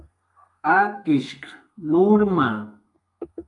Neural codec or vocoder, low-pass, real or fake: codec, 32 kHz, 1.9 kbps, SNAC; 10.8 kHz; fake